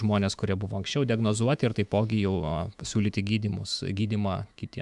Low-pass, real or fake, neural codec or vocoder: 10.8 kHz; fake; autoencoder, 48 kHz, 128 numbers a frame, DAC-VAE, trained on Japanese speech